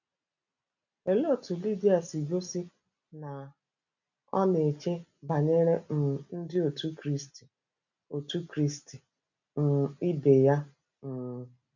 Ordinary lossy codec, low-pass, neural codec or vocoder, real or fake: none; 7.2 kHz; none; real